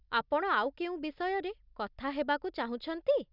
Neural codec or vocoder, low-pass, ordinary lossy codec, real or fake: none; 5.4 kHz; none; real